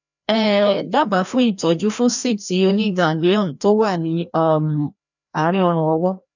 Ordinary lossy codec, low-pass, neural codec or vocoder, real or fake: none; 7.2 kHz; codec, 16 kHz, 1 kbps, FreqCodec, larger model; fake